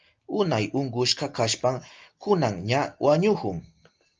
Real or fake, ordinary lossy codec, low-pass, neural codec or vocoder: real; Opus, 24 kbps; 7.2 kHz; none